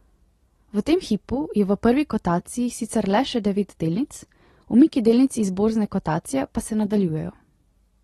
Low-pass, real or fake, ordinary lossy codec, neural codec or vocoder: 19.8 kHz; real; AAC, 32 kbps; none